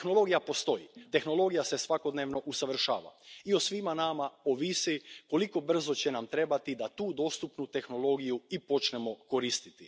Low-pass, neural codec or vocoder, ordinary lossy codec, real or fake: none; none; none; real